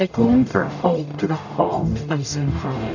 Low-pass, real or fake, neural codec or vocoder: 7.2 kHz; fake; codec, 44.1 kHz, 0.9 kbps, DAC